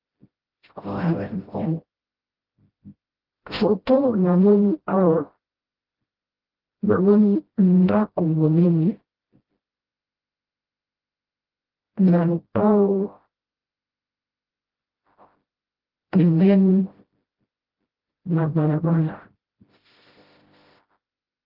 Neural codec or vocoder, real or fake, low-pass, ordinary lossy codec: codec, 16 kHz, 0.5 kbps, FreqCodec, smaller model; fake; 5.4 kHz; Opus, 16 kbps